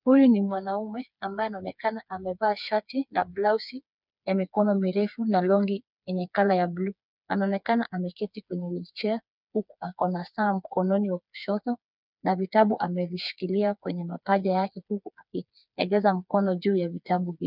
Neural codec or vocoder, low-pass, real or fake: codec, 16 kHz, 4 kbps, FreqCodec, smaller model; 5.4 kHz; fake